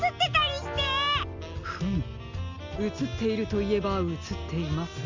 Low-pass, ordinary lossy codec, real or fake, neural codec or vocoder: 7.2 kHz; Opus, 32 kbps; real; none